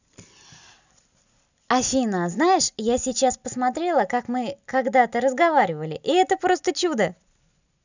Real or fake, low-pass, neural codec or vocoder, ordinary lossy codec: real; 7.2 kHz; none; none